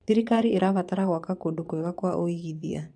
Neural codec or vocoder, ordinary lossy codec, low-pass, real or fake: vocoder, 22.05 kHz, 80 mel bands, Vocos; none; 9.9 kHz; fake